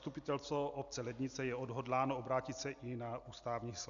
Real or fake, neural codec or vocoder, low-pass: real; none; 7.2 kHz